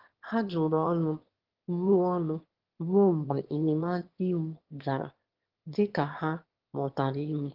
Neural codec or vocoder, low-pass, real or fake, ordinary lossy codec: autoencoder, 22.05 kHz, a latent of 192 numbers a frame, VITS, trained on one speaker; 5.4 kHz; fake; Opus, 16 kbps